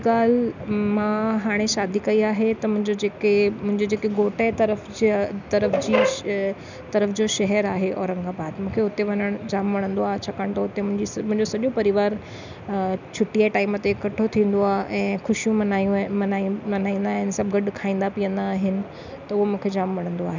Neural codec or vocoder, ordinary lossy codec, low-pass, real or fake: none; none; 7.2 kHz; real